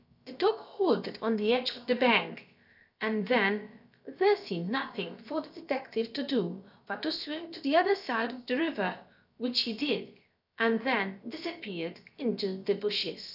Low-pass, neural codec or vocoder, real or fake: 5.4 kHz; codec, 16 kHz, about 1 kbps, DyCAST, with the encoder's durations; fake